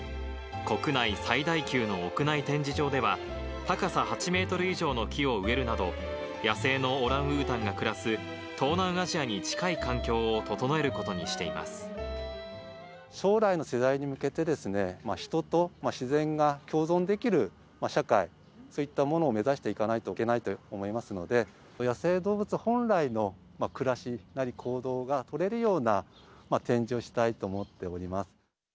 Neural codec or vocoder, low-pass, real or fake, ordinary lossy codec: none; none; real; none